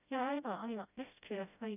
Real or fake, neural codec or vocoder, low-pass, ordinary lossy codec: fake; codec, 16 kHz, 0.5 kbps, FreqCodec, smaller model; 3.6 kHz; none